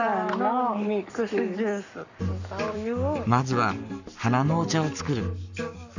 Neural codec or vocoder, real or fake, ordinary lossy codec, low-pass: codec, 44.1 kHz, 7.8 kbps, Pupu-Codec; fake; none; 7.2 kHz